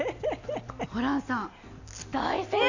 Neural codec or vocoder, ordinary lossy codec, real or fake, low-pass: none; none; real; 7.2 kHz